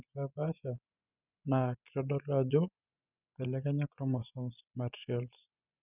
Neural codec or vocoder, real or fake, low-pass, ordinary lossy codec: vocoder, 24 kHz, 100 mel bands, Vocos; fake; 3.6 kHz; none